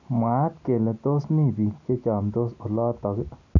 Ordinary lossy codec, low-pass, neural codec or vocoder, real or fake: none; 7.2 kHz; none; real